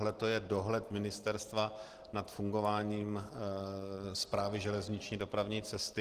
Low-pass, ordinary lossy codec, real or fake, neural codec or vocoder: 14.4 kHz; Opus, 16 kbps; real; none